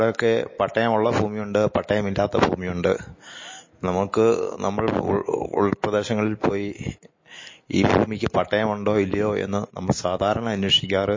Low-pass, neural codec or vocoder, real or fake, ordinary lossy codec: 7.2 kHz; none; real; MP3, 32 kbps